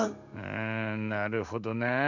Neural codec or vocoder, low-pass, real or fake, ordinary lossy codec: codec, 16 kHz in and 24 kHz out, 1 kbps, XY-Tokenizer; 7.2 kHz; fake; none